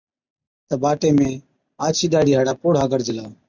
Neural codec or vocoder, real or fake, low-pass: none; real; 7.2 kHz